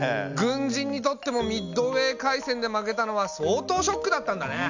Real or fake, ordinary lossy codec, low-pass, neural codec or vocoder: real; none; 7.2 kHz; none